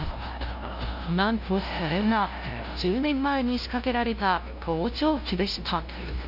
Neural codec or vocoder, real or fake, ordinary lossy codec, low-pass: codec, 16 kHz, 0.5 kbps, FunCodec, trained on LibriTTS, 25 frames a second; fake; none; 5.4 kHz